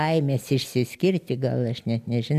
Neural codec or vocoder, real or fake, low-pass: none; real; 14.4 kHz